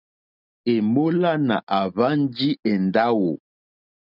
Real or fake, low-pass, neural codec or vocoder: real; 5.4 kHz; none